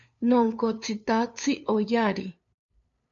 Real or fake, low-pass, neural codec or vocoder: fake; 7.2 kHz; codec, 16 kHz, 2 kbps, FunCodec, trained on LibriTTS, 25 frames a second